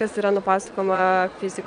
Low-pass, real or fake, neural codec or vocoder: 9.9 kHz; fake; vocoder, 22.05 kHz, 80 mel bands, Vocos